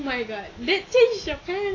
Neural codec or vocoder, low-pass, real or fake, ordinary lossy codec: none; 7.2 kHz; real; AAC, 32 kbps